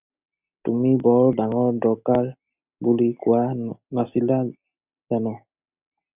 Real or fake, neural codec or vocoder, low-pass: real; none; 3.6 kHz